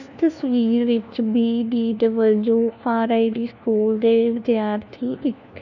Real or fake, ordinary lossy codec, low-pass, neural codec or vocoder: fake; none; 7.2 kHz; codec, 16 kHz, 1 kbps, FunCodec, trained on LibriTTS, 50 frames a second